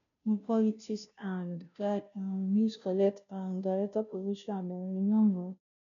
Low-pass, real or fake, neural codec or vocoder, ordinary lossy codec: 7.2 kHz; fake; codec, 16 kHz, 0.5 kbps, FunCodec, trained on Chinese and English, 25 frames a second; none